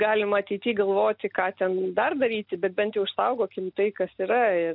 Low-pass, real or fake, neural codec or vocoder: 5.4 kHz; real; none